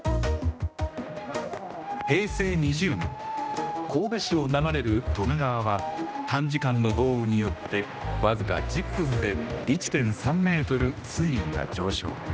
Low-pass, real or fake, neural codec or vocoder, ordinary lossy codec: none; fake; codec, 16 kHz, 1 kbps, X-Codec, HuBERT features, trained on general audio; none